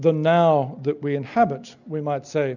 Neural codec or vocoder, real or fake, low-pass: none; real; 7.2 kHz